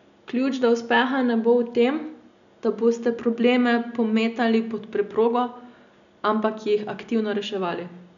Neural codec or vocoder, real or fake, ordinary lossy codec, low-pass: none; real; none; 7.2 kHz